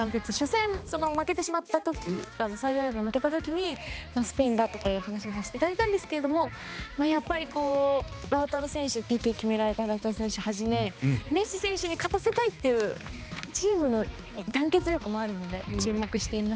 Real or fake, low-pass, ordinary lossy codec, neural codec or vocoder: fake; none; none; codec, 16 kHz, 2 kbps, X-Codec, HuBERT features, trained on balanced general audio